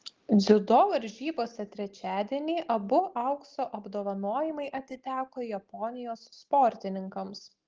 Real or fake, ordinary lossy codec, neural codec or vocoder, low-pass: real; Opus, 16 kbps; none; 7.2 kHz